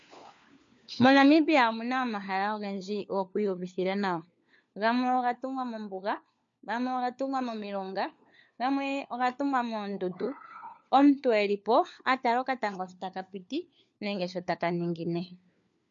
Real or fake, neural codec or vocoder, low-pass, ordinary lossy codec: fake; codec, 16 kHz, 4 kbps, FunCodec, trained on LibriTTS, 50 frames a second; 7.2 kHz; MP3, 48 kbps